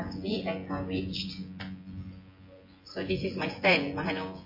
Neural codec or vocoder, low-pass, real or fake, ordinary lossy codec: vocoder, 24 kHz, 100 mel bands, Vocos; 5.4 kHz; fake; MP3, 24 kbps